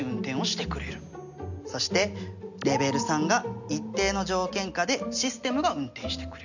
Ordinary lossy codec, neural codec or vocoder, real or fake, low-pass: none; none; real; 7.2 kHz